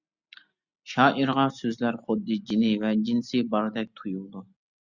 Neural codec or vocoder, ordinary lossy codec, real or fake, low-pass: none; Opus, 64 kbps; real; 7.2 kHz